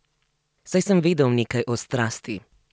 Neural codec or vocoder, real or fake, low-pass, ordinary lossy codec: none; real; none; none